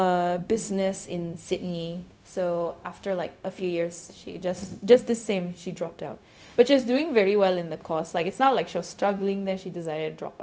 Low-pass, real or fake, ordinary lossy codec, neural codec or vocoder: none; fake; none; codec, 16 kHz, 0.4 kbps, LongCat-Audio-Codec